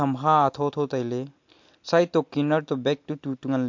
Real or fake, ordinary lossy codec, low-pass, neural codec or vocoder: real; MP3, 48 kbps; 7.2 kHz; none